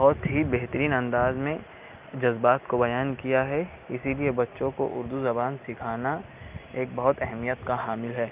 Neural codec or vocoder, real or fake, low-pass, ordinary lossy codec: none; real; 3.6 kHz; Opus, 24 kbps